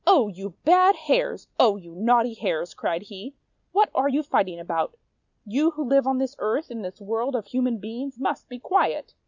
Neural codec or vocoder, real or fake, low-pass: none; real; 7.2 kHz